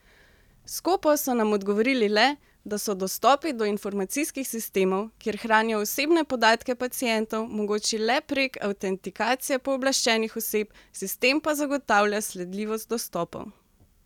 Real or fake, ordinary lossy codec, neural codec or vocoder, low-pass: real; none; none; 19.8 kHz